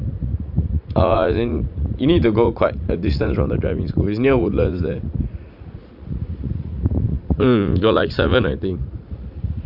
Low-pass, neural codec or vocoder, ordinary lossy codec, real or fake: 5.4 kHz; vocoder, 44.1 kHz, 80 mel bands, Vocos; none; fake